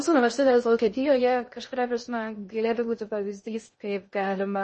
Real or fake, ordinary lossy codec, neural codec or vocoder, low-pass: fake; MP3, 32 kbps; codec, 16 kHz in and 24 kHz out, 0.6 kbps, FocalCodec, streaming, 2048 codes; 10.8 kHz